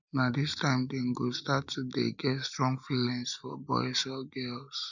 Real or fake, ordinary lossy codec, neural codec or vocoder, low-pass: real; none; none; 7.2 kHz